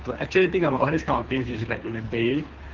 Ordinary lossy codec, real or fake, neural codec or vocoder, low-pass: Opus, 32 kbps; fake; codec, 24 kHz, 3 kbps, HILCodec; 7.2 kHz